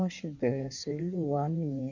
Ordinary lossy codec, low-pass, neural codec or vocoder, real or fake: none; 7.2 kHz; codec, 16 kHz in and 24 kHz out, 1.1 kbps, FireRedTTS-2 codec; fake